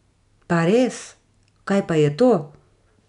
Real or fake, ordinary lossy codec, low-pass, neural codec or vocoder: real; none; 10.8 kHz; none